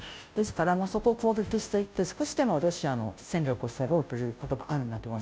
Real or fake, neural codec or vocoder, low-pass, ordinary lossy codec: fake; codec, 16 kHz, 0.5 kbps, FunCodec, trained on Chinese and English, 25 frames a second; none; none